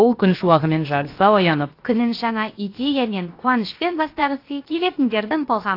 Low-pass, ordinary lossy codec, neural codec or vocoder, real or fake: 5.4 kHz; AAC, 32 kbps; codec, 16 kHz, about 1 kbps, DyCAST, with the encoder's durations; fake